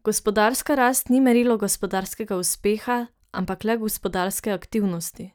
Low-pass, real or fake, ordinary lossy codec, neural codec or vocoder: none; real; none; none